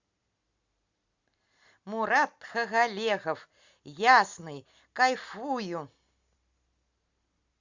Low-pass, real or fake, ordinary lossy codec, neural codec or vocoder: 7.2 kHz; real; Opus, 64 kbps; none